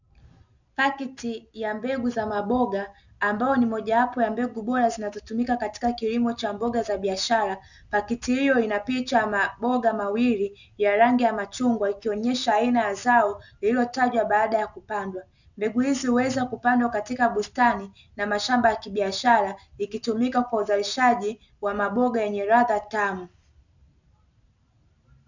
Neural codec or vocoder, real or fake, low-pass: none; real; 7.2 kHz